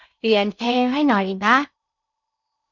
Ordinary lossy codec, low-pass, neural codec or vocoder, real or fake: none; 7.2 kHz; codec, 16 kHz in and 24 kHz out, 0.6 kbps, FocalCodec, streaming, 4096 codes; fake